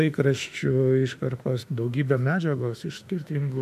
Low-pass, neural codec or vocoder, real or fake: 14.4 kHz; autoencoder, 48 kHz, 32 numbers a frame, DAC-VAE, trained on Japanese speech; fake